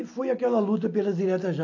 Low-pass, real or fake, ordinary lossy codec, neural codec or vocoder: 7.2 kHz; real; AAC, 48 kbps; none